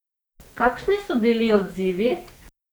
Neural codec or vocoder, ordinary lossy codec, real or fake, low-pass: codec, 44.1 kHz, 2.6 kbps, SNAC; none; fake; none